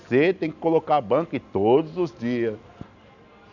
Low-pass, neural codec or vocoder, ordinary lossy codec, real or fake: 7.2 kHz; none; none; real